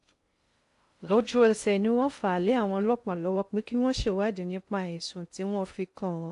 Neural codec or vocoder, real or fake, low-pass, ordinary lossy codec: codec, 16 kHz in and 24 kHz out, 0.6 kbps, FocalCodec, streaming, 2048 codes; fake; 10.8 kHz; AAC, 64 kbps